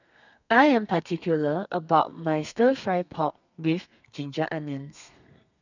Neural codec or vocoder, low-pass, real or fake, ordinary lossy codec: codec, 32 kHz, 1.9 kbps, SNAC; 7.2 kHz; fake; none